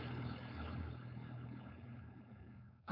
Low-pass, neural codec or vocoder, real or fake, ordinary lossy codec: 5.4 kHz; codec, 16 kHz, 4.8 kbps, FACodec; fake; none